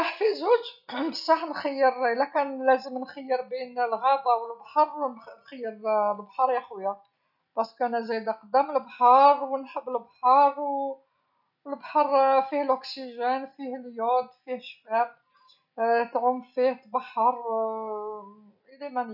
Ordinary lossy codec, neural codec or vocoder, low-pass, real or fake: none; none; 5.4 kHz; real